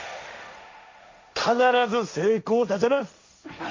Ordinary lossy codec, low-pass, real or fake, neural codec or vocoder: MP3, 64 kbps; 7.2 kHz; fake; codec, 16 kHz, 1.1 kbps, Voila-Tokenizer